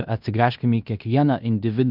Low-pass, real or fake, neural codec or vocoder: 5.4 kHz; fake; codec, 16 kHz in and 24 kHz out, 0.9 kbps, LongCat-Audio-Codec, fine tuned four codebook decoder